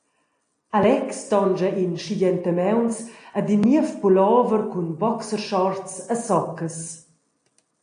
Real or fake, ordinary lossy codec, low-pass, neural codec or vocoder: real; AAC, 48 kbps; 9.9 kHz; none